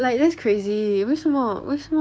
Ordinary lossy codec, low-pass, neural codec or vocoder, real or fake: none; none; codec, 16 kHz, 6 kbps, DAC; fake